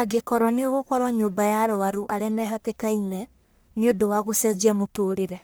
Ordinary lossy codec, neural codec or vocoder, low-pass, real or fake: none; codec, 44.1 kHz, 1.7 kbps, Pupu-Codec; none; fake